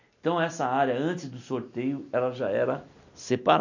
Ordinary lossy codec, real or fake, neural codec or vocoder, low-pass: none; real; none; 7.2 kHz